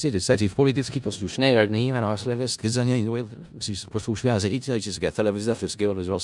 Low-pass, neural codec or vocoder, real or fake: 10.8 kHz; codec, 16 kHz in and 24 kHz out, 0.4 kbps, LongCat-Audio-Codec, four codebook decoder; fake